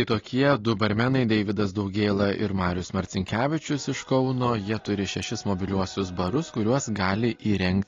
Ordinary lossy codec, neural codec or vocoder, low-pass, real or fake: AAC, 32 kbps; none; 7.2 kHz; real